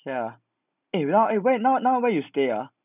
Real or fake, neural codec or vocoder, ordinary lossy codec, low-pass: real; none; none; 3.6 kHz